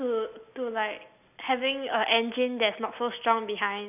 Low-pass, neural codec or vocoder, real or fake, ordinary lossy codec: 3.6 kHz; none; real; none